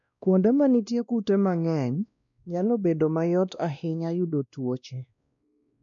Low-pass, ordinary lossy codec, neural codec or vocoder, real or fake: 7.2 kHz; none; codec, 16 kHz, 1 kbps, X-Codec, WavLM features, trained on Multilingual LibriSpeech; fake